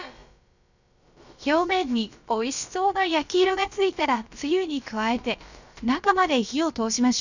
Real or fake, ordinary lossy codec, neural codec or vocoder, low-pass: fake; none; codec, 16 kHz, about 1 kbps, DyCAST, with the encoder's durations; 7.2 kHz